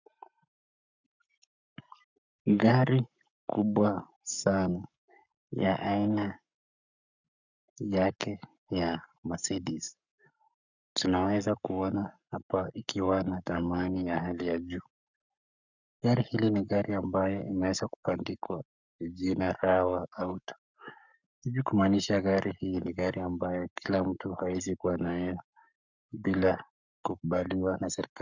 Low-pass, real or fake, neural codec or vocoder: 7.2 kHz; fake; codec, 44.1 kHz, 7.8 kbps, Pupu-Codec